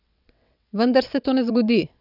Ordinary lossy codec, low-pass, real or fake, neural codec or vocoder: none; 5.4 kHz; fake; vocoder, 22.05 kHz, 80 mel bands, WaveNeXt